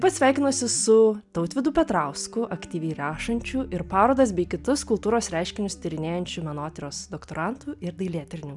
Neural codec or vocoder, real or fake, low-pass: none; real; 10.8 kHz